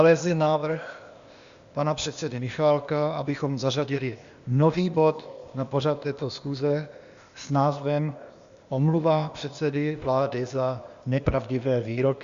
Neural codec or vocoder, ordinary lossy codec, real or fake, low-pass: codec, 16 kHz, 0.8 kbps, ZipCodec; Opus, 64 kbps; fake; 7.2 kHz